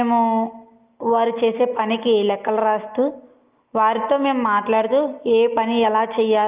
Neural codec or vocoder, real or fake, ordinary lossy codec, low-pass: none; real; Opus, 32 kbps; 3.6 kHz